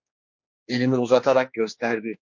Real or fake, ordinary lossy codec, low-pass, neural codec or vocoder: fake; MP3, 48 kbps; 7.2 kHz; codec, 16 kHz, 2 kbps, X-Codec, HuBERT features, trained on general audio